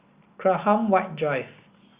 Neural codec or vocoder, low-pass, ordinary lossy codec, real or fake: none; 3.6 kHz; Opus, 64 kbps; real